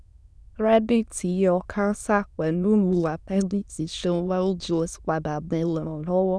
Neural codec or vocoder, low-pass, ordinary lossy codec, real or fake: autoencoder, 22.05 kHz, a latent of 192 numbers a frame, VITS, trained on many speakers; none; none; fake